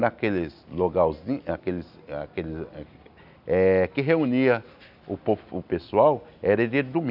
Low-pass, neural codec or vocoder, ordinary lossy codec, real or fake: 5.4 kHz; none; none; real